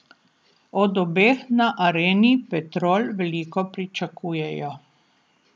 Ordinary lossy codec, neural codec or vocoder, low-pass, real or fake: none; none; none; real